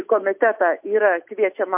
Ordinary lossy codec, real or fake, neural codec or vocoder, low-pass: MP3, 32 kbps; real; none; 3.6 kHz